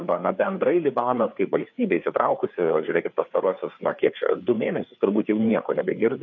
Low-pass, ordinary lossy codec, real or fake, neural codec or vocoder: 7.2 kHz; AAC, 48 kbps; fake; codec, 16 kHz, 4 kbps, FreqCodec, larger model